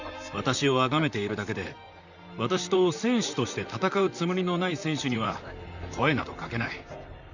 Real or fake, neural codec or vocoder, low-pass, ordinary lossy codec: fake; vocoder, 44.1 kHz, 128 mel bands, Pupu-Vocoder; 7.2 kHz; none